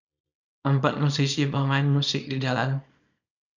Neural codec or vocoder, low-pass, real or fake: codec, 24 kHz, 0.9 kbps, WavTokenizer, small release; 7.2 kHz; fake